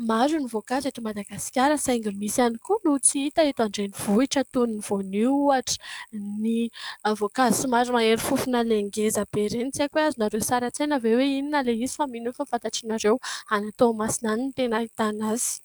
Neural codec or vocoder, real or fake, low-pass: codec, 44.1 kHz, 7.8 kbps, DAC; fake; 19.8 kHz